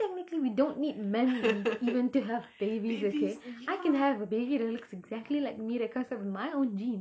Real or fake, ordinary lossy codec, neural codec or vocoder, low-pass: real; none; none; none